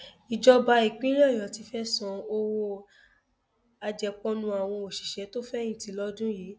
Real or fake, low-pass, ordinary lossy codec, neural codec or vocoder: real; none; none; none